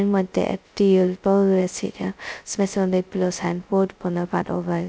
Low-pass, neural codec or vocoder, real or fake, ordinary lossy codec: none; codec, 16 kHz, 0.2 kbps, FocalCodec; fake; none